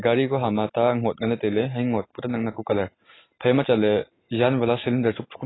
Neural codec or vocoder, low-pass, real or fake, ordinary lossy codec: none; 7.2 kHz; real; AAC, 16 kbps